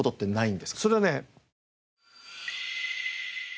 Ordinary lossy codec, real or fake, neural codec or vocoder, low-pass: none; real; none; none